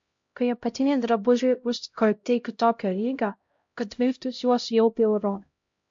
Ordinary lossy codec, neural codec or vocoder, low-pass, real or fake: MP3, 48 kbps; codec, 16 kHz, 0.5 kbps, X-Codec, HuBERT features, trained on LibriSpeech; 7.2 kHz; fake